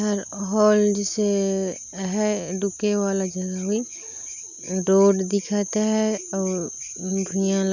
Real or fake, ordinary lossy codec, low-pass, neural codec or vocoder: real; none; 7.2 kHz; none